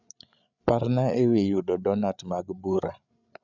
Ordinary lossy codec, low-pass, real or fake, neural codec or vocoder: none; 7.2 kHz; fake; codec, 16 kHz, 8 kbps, FreqCodec, larger model